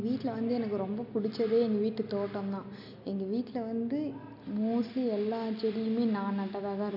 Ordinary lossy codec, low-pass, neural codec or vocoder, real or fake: none; 5.4 kHz; none; real